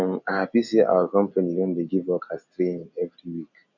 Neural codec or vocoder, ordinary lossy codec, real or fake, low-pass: none; none; real; 7.2 kHz